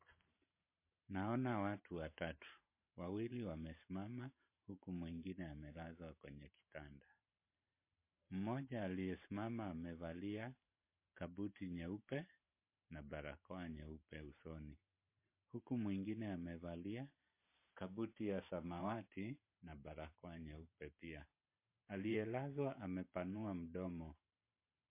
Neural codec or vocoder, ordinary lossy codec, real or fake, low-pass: vocoder, 44.1 kHz, 128 mel bands every 512 samples, BigVGAN v2; MP3, 24 kbps; fake; 3.6 kHz